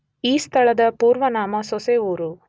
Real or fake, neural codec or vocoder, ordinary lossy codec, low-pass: real; none; none; none